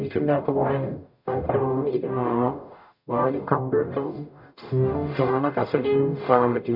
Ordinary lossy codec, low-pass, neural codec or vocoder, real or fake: none; 5.4 kHz; codec, 44.1 kHz, 0.9 kbps, DAC; fake